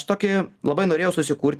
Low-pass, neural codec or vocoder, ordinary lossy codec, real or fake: 14.4 kHz; none; Opus, 24 kbps; real